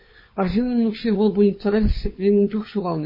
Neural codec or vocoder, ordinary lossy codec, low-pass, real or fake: codec, 16 kHz, 4 kbps, FunCodec, trained on LibriTTS, 50 frames a second; MP3, 24 kbps; 5.4 kHz; fake